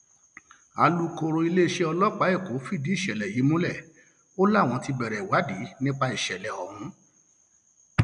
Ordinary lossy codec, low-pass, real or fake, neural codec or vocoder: none; 9.9 kHz; real; none